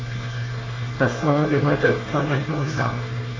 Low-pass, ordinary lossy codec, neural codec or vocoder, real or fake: 7.2 kHz; AAC, 32 kbps; codec, 24 kHz, 1 kbps, SNAC; fake